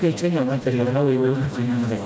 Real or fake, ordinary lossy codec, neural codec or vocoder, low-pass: fake; none; codec, 16 kHz, 0.5 kbps, FreqCodec, smaller model; none